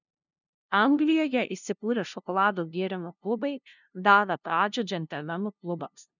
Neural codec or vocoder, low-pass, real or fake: codec, 16 kHz, 0.5 kbps, FunCodec, trained on LibriTTS, 25 frames a second; 7.2 kHz; fake